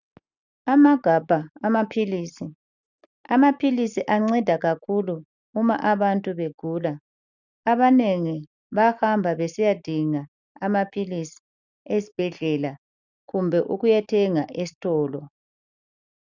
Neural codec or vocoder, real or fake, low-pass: none; real; 7.2 kHz